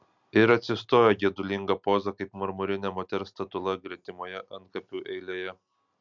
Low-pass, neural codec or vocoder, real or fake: 7.2 kHz; none; real